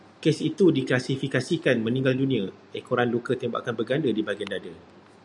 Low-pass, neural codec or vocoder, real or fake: 10.8 kHz; none; real